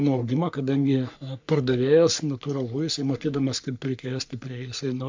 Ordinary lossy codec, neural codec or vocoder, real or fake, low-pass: MP3, 64 kbps; codec, 44.1 kHz, 3.4 kbps, Pupu-Codec; fake; 7.2 kHz